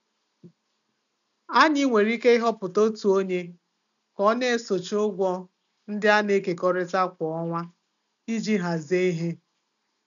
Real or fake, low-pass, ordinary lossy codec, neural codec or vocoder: real; 7.2 kHz; none; none